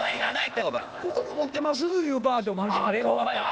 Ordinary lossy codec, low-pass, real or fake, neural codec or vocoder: none; none; fake; codec, 16 kHz, 0.8 kbps, ZipCodec